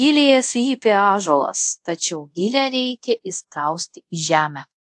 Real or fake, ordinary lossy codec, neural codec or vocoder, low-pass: fake; AAC, 64 kbps; codec, 24 kHz, 0.5 kbps, DualCodec; 10.8 kHz